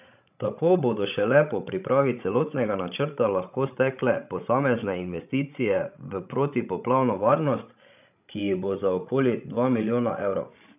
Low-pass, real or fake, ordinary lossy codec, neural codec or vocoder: 3.6 kHz; fake; none; codec, 16 kHz, 16 kbps, FreqCodec, larger model